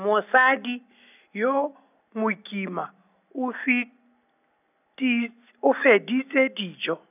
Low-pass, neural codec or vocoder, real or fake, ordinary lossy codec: 3.6 kHz; none; real; none